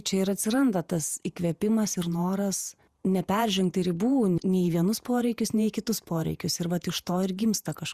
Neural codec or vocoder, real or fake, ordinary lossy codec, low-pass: vocoder, 48 kHz, 128 mel bands, Vocos; fake; Opus, 64 kbps; 14.4 kHz